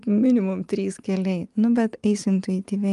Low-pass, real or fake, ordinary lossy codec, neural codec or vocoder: 10.8 kHz; real; Opus, 32 kbps; none